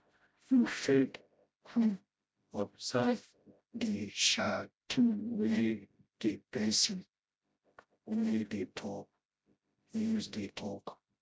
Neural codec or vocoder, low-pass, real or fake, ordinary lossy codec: codec, 16 kHz, 0.5 kbps, FreqCodec, smaller model; none; fake; none